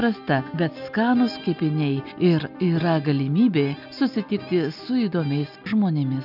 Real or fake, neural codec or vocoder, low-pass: real; none; 5.4 kHz